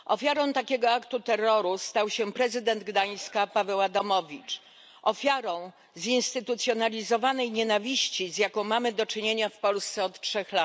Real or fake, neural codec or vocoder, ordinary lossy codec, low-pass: real; none; none; none